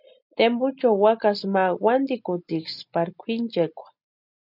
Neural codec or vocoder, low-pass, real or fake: none; 5.4 kHz; real